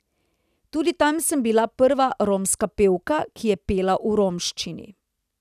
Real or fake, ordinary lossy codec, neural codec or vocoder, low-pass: real; none; none; 14.4 kHz